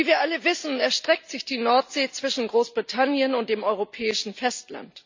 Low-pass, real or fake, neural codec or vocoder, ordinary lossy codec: 7.2 kHz; real; none; MP3, 64 kbps